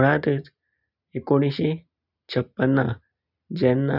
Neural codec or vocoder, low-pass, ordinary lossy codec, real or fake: none; 5.4 kHz; none; real